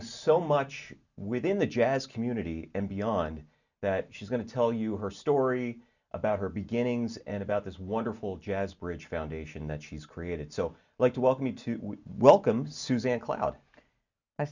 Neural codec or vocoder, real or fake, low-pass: none; real; 7.2 kHz